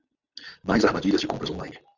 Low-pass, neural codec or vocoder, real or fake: 7.2 kHz; none; real